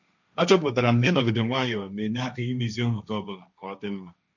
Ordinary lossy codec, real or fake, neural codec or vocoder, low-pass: none; fake; codec, 16 kHz, 1.1 kbps, Voila-Tokenizer; 7.2 kHz